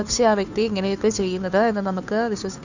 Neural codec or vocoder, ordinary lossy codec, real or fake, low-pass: codec, 16 kHz, 2 kbps, FunCodec, trained on Chinese and English, 25 frames a second; none; fake; 7.2 kHz